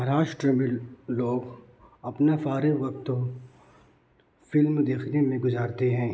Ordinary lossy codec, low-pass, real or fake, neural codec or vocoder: none; none; real; none